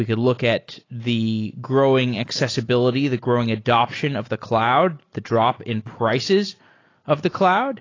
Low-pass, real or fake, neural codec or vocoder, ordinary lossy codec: 7.2 kHz; real; none; AAC, 32 kbps